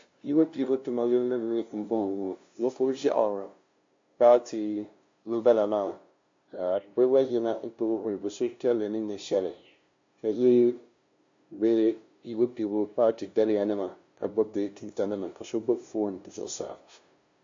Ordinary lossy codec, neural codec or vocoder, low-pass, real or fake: MP3, 48 kbps; codec, 16 kHz, 0.5 kbps, FunCodec, trained on LibriTTS, 25 frames a second; 7.2 kHz; fake